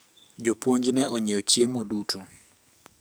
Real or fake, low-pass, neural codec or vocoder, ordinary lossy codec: fake; none; codec, 44.1 kHz, 2.6 kbps, SNAC; none